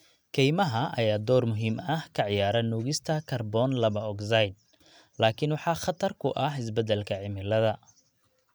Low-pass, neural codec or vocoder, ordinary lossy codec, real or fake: none; none; none; real